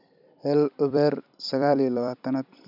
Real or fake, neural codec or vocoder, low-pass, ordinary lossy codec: fake; vocoder, 44.1 kHz, 128 mel bands every 256 samples, BigVGAN v2; 5.4 kHz; none